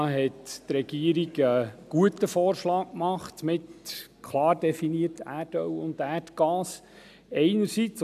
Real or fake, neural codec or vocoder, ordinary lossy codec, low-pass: real; none; MP3, 96 kbps; 14.4 kHz